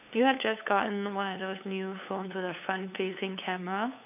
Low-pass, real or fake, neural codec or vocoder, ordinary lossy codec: 3.6 kHz; fake; codec, 16 kHz, 8 kbps, FunCodec, trained on LibriTTS, 25 frames a second; none